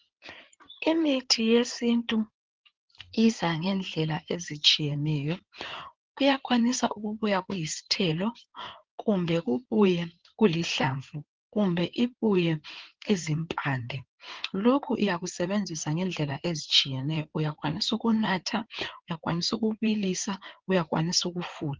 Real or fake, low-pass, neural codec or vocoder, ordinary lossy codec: fake; 7.2 kHz; codec, 16 kHz in and 24 kHz out, 2.2 kbps, FireRedTTS-2 codec; Opus, 16 kbps